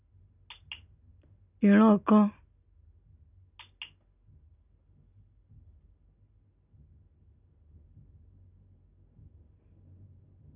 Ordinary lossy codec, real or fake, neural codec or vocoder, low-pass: none; real; none; 3.6 kHz